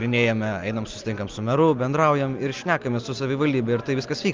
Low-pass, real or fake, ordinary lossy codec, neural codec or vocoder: 7.2 kHz; real; Opus, 24 kbps; none